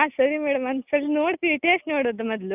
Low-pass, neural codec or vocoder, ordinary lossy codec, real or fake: 3.6 kHz; none; none; real